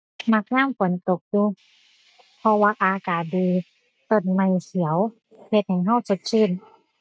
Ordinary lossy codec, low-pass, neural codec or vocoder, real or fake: none; none; none; real